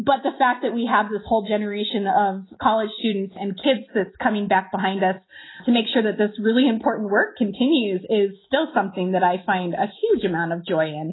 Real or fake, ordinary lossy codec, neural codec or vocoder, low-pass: real; AAC, 16 kbps; none; 7.2 kHz